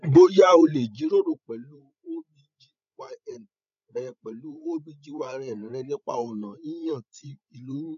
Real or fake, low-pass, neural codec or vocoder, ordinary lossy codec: fake; 7.2 kHz; codec, 16 kHz, 16 kbps, FreqCodec, larger model; none